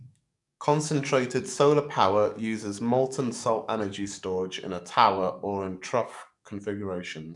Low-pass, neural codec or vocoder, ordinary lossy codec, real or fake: 10.8 kHz; codec, 44.1 kHz, 7.8 kbps, DAC; none; fake